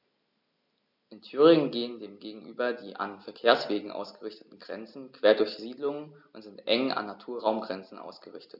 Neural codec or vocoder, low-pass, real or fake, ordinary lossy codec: none; 5.4 kHz; real; none